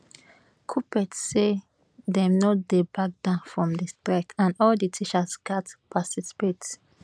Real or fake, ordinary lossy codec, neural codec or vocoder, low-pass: real; none; none; none